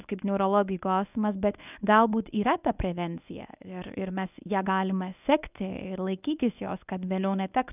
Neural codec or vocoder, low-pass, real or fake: codec, 24 kHz, 0.9 kbps, WavTokenizer, medium speech release version 1; 3.6 kHz; fake